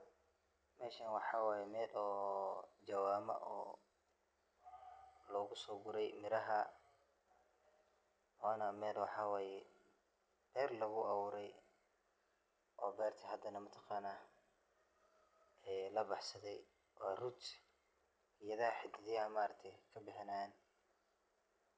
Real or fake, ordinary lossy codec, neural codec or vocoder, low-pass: real; none; none; none